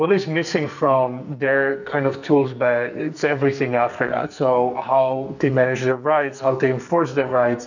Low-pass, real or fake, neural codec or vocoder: 7.2 kHz; fake; codec, 44.1 kHz, 2.6 kbps, SNAC